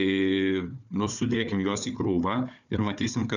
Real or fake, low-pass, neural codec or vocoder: fake; 7.2 kHz; codec, 16 kHz, 4 kbps, FunCodec, trained on Chinese and English, 50 frames a second